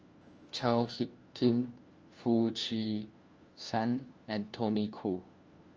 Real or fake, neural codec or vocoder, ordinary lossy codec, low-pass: fake; codec, 16 kHz, 1 kbps, FunCodec, trained on LibriTTS, 50 frames a second; Opus, 24 kbps; 7.2 kHz